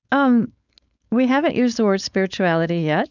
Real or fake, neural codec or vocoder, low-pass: fake; codec, 16 kHz, 4.8 kbps, FACodec; 7.2 kHz